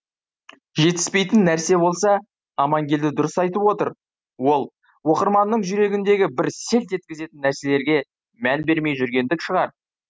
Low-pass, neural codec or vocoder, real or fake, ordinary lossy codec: none; none; real; none